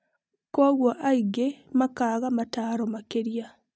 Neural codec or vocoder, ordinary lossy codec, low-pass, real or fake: none; none; none; real